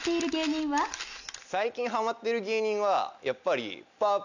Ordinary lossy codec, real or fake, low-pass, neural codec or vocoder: none; real; 7.2 kHz; none